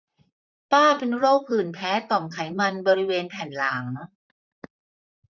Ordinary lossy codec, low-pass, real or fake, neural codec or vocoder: none; 7.2 kHz; fake; vocoder, 44.1 kHz, 128 mel bands, Pupu-Vocoder